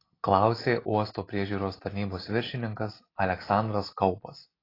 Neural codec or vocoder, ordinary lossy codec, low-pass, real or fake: none; AAC, 24 kbps; 5.4 kHz; real